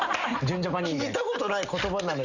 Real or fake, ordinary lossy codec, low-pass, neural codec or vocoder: real; none; 7.2 kHz; none